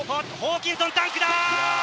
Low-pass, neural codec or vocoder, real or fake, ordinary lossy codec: none; none; real; none